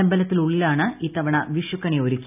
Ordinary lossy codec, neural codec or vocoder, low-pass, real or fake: none; none; 3.6 kHz; real